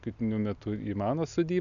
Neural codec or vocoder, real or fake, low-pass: none; real; 7.2 kHz